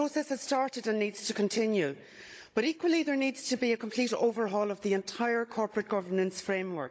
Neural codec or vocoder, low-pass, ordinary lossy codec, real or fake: codec, 16 kHz, 16 kbps, FunCodec, trained on Chinese and English, 50 frames a second; none; none; fake